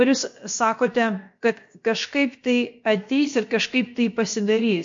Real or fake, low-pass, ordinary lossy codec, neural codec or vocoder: fake; 7.2 kHz; MP3, 48 kbps; codec, 16 kHz, about 1 kbps, DyCAST, with the encoder's durations